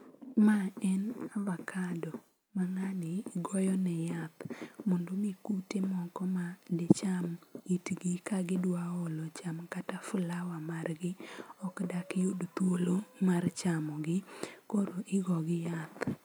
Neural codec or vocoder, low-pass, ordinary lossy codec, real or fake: none; none; none; real